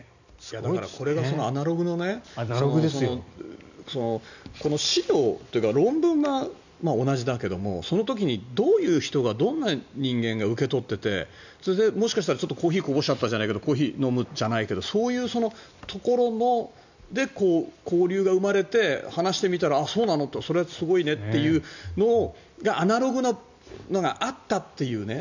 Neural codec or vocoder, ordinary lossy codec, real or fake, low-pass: none; none; real; 7.2 kHz